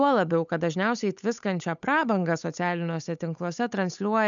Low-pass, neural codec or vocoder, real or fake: 7.2 kHz; codec, 16 kHz, 8 kbps, FunCodec, trained on Chinese and English, 25 frames a second; fake